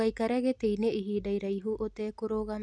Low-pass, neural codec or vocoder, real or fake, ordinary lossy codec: none; none; real; none